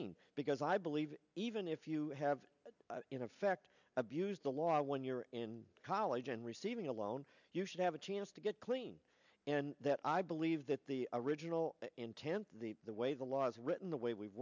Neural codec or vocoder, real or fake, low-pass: none; real; 7.2 kHz